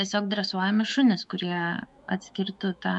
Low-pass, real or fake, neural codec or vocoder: 10.8 kHz; fake; autoencoder, 48 kHz, 128 numbers a frame, DAC-VAE, trained on Japanese speech